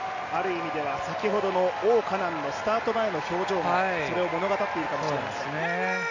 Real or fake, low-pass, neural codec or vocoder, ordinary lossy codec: real; 7.2 kHz; none; none